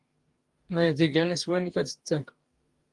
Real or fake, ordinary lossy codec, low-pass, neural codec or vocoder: fake; Opus, 24 kbps; 10.8 kHz; codec, 44.1 kHz, 2.6 kbps, DAC